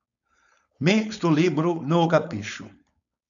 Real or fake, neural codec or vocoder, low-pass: fake; codec, 16 kHz, 4.8 kbps, FACodec; 7.2 kHz